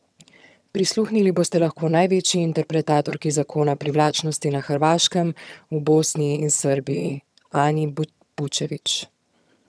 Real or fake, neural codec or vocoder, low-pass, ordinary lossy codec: fake; vocoder, 22.05 kHz, 80 mel bands, HiFi-GAN; none; none